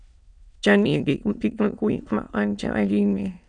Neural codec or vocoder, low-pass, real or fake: autoencoder, 22.05 kHz, a latent of 192 numbers a frame, VITS, trained on many speakers; 9.9 kHz; fake